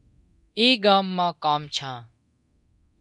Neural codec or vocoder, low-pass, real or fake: codec, 24 kHz, 0.9 kbps, DualCodec; 10.8 kHz; fake